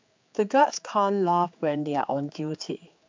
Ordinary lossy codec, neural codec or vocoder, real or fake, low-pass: MP3, 64 kbps; codec, 16 kHz, 4 kbps, X-Codec, HuBERT features, trained on general audio; fake; 7.2 kHz